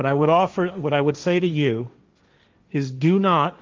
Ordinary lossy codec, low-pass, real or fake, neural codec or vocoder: Opus, 32 kbps; 7.2 kHz; fake; codec, 16 kHz, 1.1 kbps, Voila-Tokenizer